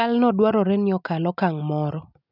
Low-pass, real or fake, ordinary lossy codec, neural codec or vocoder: 5.4 kHz; real; none; none